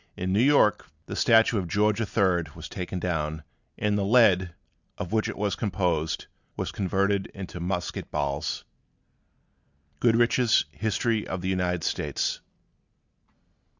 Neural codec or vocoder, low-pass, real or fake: none; 7.2 kHz; real